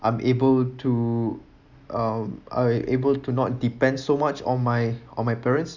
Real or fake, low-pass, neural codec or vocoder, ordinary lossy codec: real; 7.2 kHz; none; none